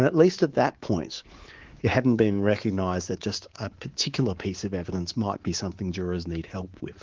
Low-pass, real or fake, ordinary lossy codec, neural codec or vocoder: 7.2 kHz; fake; Opus, 16 kbps; codec, 24 kHz, 3.1 kbps, DualCodec